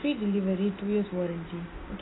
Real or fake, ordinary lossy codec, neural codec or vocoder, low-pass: real; AAC, 16 kbps; none; 7.2 kHz